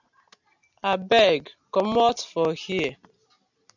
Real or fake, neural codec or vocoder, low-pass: real; none; 7.2 kHz